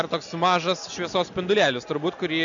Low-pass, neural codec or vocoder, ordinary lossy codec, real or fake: 7.2 kHz; none; MP3, 64 kbps; real